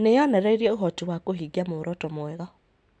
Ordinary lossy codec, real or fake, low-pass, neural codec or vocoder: none; real; 9.9 kHz; none